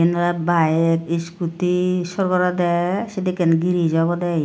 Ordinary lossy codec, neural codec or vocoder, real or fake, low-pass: none; none; real; none